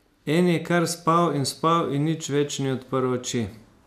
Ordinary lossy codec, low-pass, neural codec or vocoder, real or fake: none; 14.4 kHz; none; real